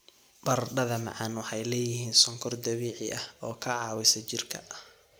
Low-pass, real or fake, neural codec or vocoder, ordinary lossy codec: none; real; none; none